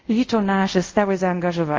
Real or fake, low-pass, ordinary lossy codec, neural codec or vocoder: fake; 7.2 kHz; Opus, 24 kbps; codec, 24 kHz, 0.5 kbps, DualCodec